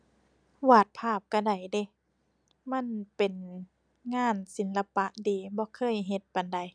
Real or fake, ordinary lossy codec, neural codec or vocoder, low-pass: real; none; none; 9.9 kHz